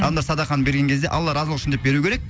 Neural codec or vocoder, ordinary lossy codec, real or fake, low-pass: none; none; real; none